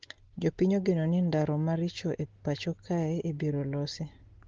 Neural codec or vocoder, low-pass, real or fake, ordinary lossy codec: none; 7.2 kHz; real; Opus, 16 kbps